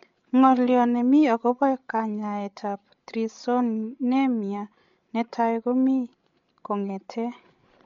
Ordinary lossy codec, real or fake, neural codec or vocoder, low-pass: MP3, 48 kbps; fake; codec, 16 kHz, 16 kbps, FreqCodec, larger model; 7.2 kHz